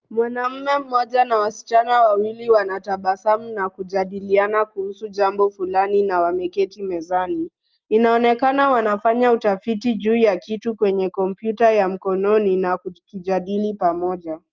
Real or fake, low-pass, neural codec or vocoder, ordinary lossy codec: real; 7.2 kHz; none; Opus, 32 kbps